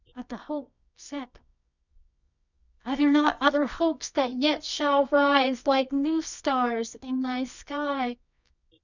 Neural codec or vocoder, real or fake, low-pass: codec, 24 kHz, 0.9 kbps, WavTokenizer, medium music audio release; fake; 7.2 kHz